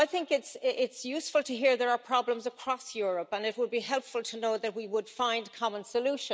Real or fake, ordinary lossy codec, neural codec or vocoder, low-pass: real; none; none; none